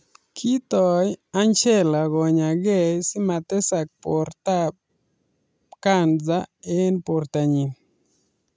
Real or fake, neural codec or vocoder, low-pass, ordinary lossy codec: real; none; none; none